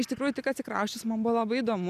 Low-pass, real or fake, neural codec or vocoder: 14.4 kHz; real; none